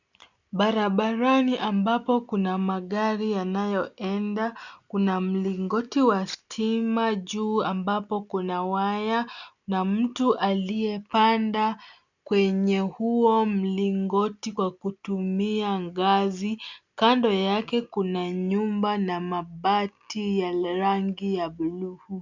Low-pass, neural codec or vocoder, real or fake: 7.2 kHz; none; real